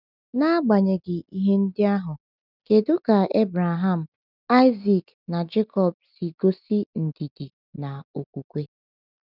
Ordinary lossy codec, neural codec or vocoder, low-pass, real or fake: none; none; 5.4 kHz; real